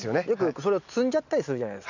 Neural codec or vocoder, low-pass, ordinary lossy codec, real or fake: none; 7.2 kHz; none; real